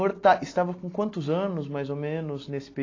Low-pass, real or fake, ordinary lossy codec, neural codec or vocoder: 7.2 kHz; real; AAC, 32 kbps; none